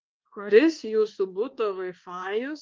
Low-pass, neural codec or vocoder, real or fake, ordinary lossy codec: 7.2 kHz; codec, 24 kHz, 1.2 kbps, DualCodec; fake; Opus, 16 kbps